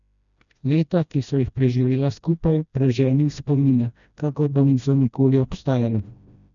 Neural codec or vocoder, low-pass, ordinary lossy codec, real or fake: codec, 16 kHz, 1 kbps, FreqCodec, smaller model; 7.2 kHz; none; fake